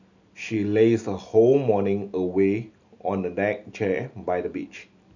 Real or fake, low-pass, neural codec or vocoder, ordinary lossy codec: real; 7.2 kHz; none; none